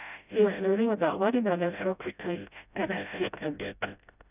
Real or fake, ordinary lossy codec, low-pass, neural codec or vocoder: fake; none; 3.6 kHz; codec, 16 kHz, 0.5 kbps, FreqCodec, smaller model